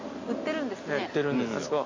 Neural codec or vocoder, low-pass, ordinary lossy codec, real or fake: none; 7.2 kHz; MP3, 32 kbps; real